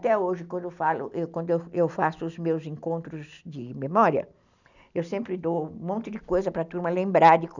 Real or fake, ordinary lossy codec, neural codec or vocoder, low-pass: real; none; none; 7.2 kHz